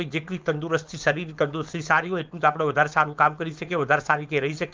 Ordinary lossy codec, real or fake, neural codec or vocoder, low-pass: Opus, 32 kbps; fake; codec, 16 kHz, 4.8 kbps, FACodec; 7.2 kHz